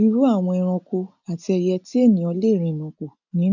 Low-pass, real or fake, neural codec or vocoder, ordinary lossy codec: 7.2 kHz; real; none; none